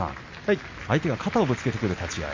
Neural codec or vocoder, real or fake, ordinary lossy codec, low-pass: none; real; MP3, 48 kbps; 7.2 kHz